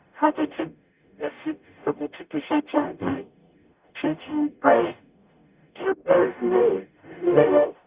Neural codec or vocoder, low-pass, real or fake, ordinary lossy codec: codec, 44.1 kHz, 0.9 kbps, DAC; 3.6 kHz; fake; Opus, 64 kbps